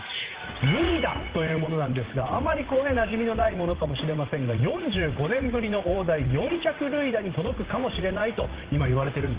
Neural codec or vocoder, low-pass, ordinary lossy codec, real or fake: vocoder, 44.1 kHz, 80 mel bands, Vocos; 3.6 kHz; Opus, 16 kbps; fake